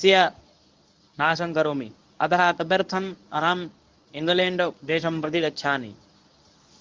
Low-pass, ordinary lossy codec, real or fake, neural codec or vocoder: 7.2 kHz; Opus, 24 kbps; fake; codec, 24 kHz, 0.9 kbps, WavTokenizer, medium speech release version 1